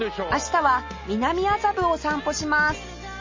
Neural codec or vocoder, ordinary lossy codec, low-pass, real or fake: none; MP3, 32 kbps; 7.2 kHz; real